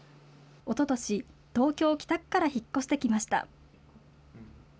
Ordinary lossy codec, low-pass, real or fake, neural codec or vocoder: none; none; real; none